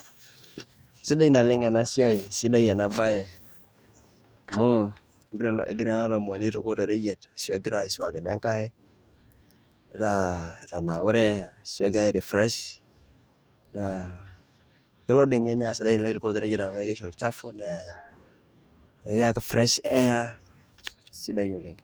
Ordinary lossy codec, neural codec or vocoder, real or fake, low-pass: none; codec, 44.1 kHz, 2.6 kbps, DAC; fake; none